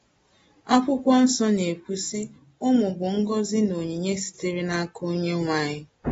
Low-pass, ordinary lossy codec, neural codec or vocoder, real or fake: 19.8 kHz; AAC, 24 kbps; none; real